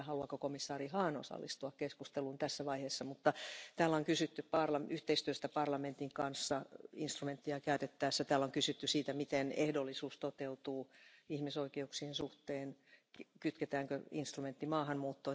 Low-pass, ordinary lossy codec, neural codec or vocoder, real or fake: none; none; none; real